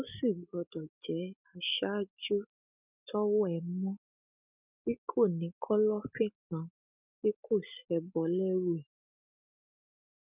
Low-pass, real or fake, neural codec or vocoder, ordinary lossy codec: 3.6 kHz; real; none; none